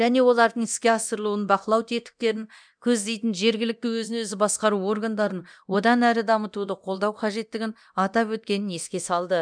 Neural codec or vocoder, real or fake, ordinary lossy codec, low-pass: codec, 24 kHz, 0.9 kbps, DualCodec; fake; none; 9.9 kHz